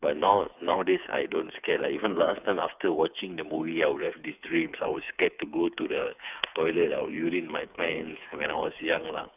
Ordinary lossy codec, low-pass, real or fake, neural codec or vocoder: none; 3.6 kHz; fake; codec, 16 kHz, 4 kbps, FreqCodec, smaller model